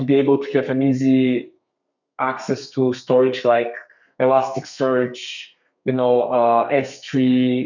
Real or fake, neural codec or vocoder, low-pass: fake; codec, 32 kHz, 1.9 kbps, SNAC; 7.2 kHz